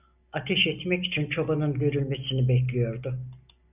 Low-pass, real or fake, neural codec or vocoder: 3.6 kHz; real; none